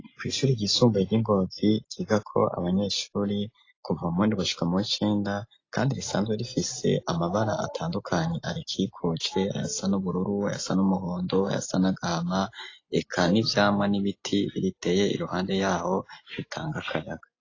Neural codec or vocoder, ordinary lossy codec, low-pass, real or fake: none; AAC, 32 kbps; 7.2 kHz; real